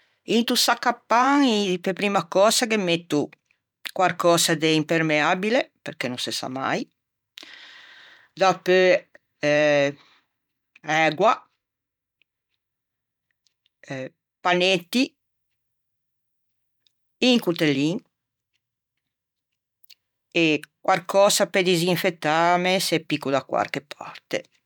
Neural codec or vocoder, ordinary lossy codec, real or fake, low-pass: vocoder, 44.1 kHz, 128 mel bands every 512 samples, BigVGAN v2; none; fake; 19.8 kHz